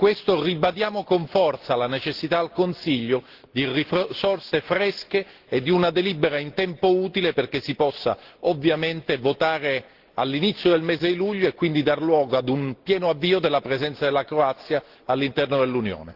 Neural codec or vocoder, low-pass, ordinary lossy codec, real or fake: none; 5.4 kHz; Opus, 16 kbps; real